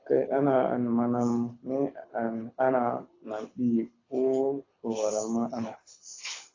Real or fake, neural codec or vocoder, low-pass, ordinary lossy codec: fake; codec, 24 kHz, 6 kbps, HILCodec; 7.2 kHz; AAC, 32 kbps